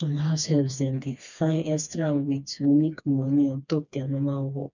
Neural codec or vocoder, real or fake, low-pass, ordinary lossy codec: codec, 16 kHz, 2 kbps, FreqCodec, smaller model; fake; 7.2 kHz; none